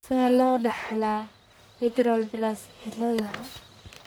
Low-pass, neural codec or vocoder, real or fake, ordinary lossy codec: none; codec, 44.1 kHz, 1.7 kbps, Pupu-Codec; fake; none